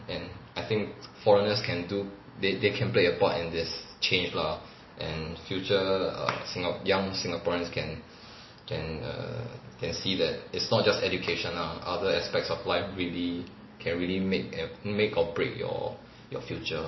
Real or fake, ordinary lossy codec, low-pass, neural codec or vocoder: real; MP3, 24 kbps; 7.2 kHz; none